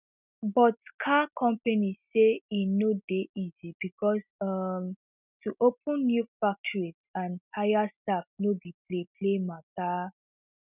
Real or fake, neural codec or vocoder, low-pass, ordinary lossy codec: real; none; 3.6 kHz; none